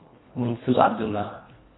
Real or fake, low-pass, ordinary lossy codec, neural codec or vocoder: fake; 7.2 kHz; AAC, 16 kbps; codec, 24 kHz, 1.5 kbps, HILCodec